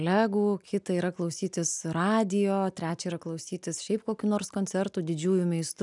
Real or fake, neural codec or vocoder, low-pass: real; none; 10.8 kHz